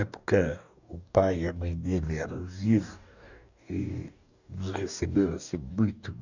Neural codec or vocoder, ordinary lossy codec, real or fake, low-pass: codec, 44.1 kHz, 2.6 kbps, DAC; none; fake; 7.2 kHz